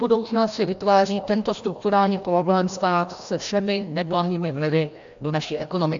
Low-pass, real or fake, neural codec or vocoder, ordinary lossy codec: 7.2 kHz; fake; codec, 16 kHz, 1 kbps, FreqCodec, larger model; AAC, 64 kbps